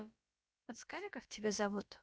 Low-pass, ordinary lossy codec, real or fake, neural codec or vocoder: none; none; fake; codec, 16 kHz, about 1 kbps, DyCAST, with the encoder's durations